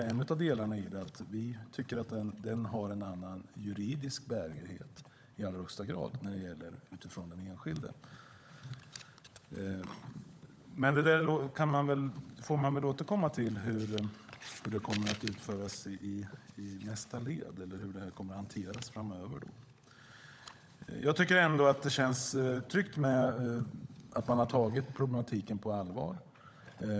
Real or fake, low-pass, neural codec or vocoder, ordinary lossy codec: fake; none; codec, 16 kHz, 16 kbps, FunCodec, trained on LibriTTS, 50 frames a second; none